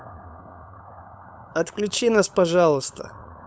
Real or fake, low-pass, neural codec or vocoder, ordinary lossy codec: fake; none; codec, 16 kHz, 8 kbps, FunCodec, trained on LibriTTS, 25 frames a second; none